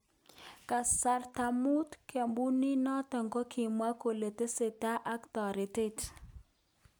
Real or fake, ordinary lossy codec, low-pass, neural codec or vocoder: real; none; none; none